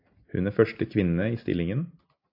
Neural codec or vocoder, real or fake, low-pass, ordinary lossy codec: none; real; 5.4 kHz; AAC, 48 kbps